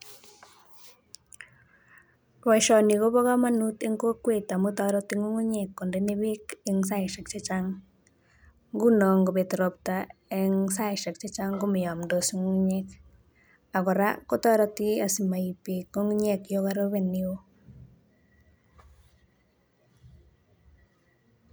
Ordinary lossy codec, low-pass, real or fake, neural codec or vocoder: none; none; real; none